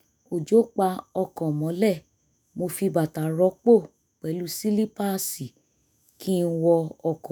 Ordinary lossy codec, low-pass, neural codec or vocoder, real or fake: none; none; autoencoder, 48 kHz, 128 numbers a frame, DAC-VAE, trained on Japanese speech; fake